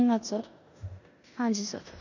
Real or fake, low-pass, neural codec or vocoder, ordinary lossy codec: fake; 7.2 kHz; codec, 16 kHz in and 24 kHz out, 0.9 kbps, LongCat-Audio-Codec, four codebook decoder; none